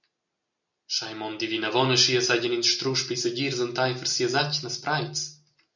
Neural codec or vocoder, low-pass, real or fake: none; 7.2 kHz; real